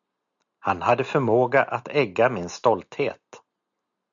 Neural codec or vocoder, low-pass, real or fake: none; 7.2 kHz; real